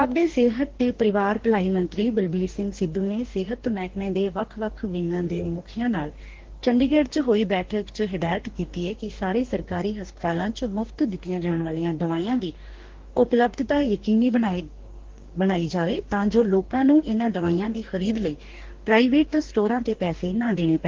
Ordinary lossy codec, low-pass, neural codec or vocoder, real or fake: Opus, 16 kbps; 7.2 kHz; codec, 44.1 kHz, 2.6 kbps, DAC; fake